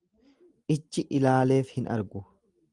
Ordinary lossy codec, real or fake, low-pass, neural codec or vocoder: Opus, 24 kbps; real; 10.8 kHz; none